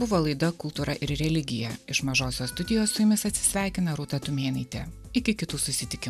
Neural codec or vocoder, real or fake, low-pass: none; real; 14.4 kHz